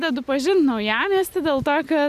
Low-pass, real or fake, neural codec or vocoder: 14.4 kHz; real; none